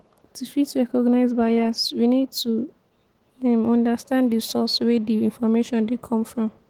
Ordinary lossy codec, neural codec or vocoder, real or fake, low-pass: Opus, 16 kbps; autoencoder, 48 kHz, 128 numbers a frame, DAC-VAE, trained on Japanese speech; fake; 19.8 kHz